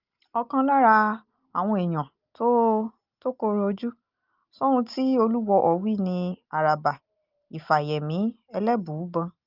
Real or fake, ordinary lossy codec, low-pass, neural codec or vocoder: real; Opus, 32 kbps; 5.4 kHz; none